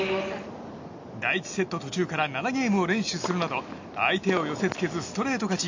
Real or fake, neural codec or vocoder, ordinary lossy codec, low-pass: real; none; MP3, 48 kbps; 7.2 kHz